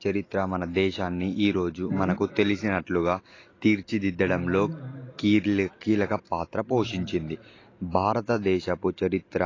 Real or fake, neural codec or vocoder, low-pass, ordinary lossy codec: real; none; 7.2 kHz; AAC, 32 kbps